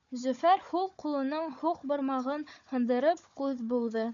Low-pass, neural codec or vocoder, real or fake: 7.2 kHz; codec, 16 kHz, 4 kbps, FunCodec, trained on Chinese and English, 50 frames a second; fake